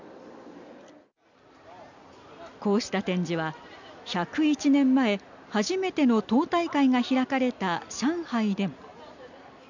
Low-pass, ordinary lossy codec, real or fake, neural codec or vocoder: 7.2 kHz; none; real; none